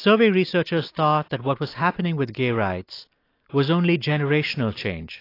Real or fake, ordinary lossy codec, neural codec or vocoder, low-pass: real; AAC, 32 kbps; none; 5.4 kHz